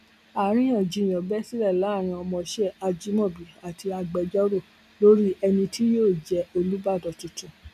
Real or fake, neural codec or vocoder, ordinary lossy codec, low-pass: real; none; none; 14.4 kHz